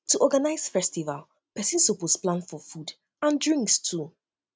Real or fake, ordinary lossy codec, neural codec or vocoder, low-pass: real; none; none; none